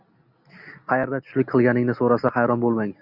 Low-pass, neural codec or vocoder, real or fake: 5.4 kHz; none; real